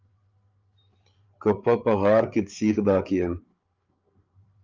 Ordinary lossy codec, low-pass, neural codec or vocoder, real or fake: Opus, 32 kbps; 7.2 kHz; codec, 16 kHz, 8 kbps, FreqCodec, larger model; fake